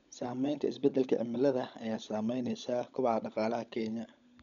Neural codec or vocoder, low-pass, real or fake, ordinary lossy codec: codec, 16 kHz, 16 kbps, FunCodec, trained on LibriTTS, 50 frames a second; 7.2 kHz; fake; none